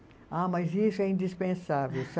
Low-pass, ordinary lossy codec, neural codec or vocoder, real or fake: none; none; none; real